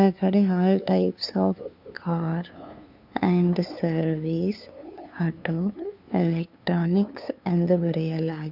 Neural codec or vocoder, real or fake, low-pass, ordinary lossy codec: codec, 16 kHz, 2 kbps, FunCodec, trained on LibriTTS, 25 frames a second; fake; 5.4 kHz; none